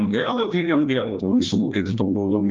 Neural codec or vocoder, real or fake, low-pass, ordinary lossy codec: codec, 16 kHz, 1 kbps, FreqCodec, larger model; fake; 7.2 kHz; Opus, 32 kbps